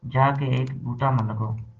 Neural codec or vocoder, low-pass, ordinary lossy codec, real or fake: none; 7.2 kHz; Opus, 16 kbps; real